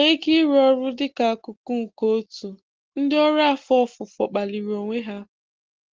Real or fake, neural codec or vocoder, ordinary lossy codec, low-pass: real; none; Opus, 16 kbps; 7.2 kHz